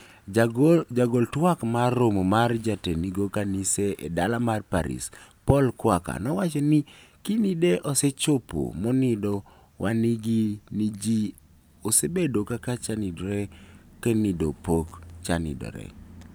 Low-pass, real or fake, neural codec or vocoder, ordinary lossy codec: none; fake; vocoder, 44.1 kHz, 128 mel bands every 512 samples, BigVGAN v2; none